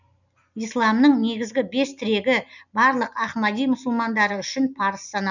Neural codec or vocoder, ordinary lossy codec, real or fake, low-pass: none; none; real; 7.2 kHz